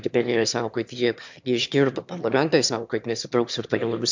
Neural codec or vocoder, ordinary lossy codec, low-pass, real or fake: autoencoder, 22.05 kHz, a latent of 192 numbers a frame, VITS, trained on one speaker; MP3, 64 kbps; 7.2 kHz; fake